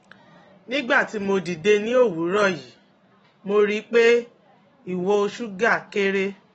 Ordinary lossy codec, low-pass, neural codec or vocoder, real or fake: AAC, 24 kbps; 10.8 kHz; none; real